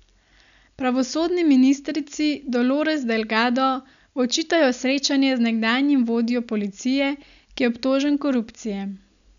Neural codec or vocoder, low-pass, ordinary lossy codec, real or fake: none; 7.2 kHz; none; real